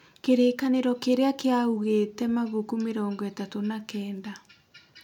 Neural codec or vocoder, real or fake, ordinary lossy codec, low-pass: none; real; none; 19.8 kHz